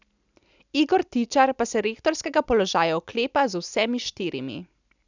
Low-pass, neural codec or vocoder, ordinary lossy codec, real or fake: 7.2 kHz; none; none; real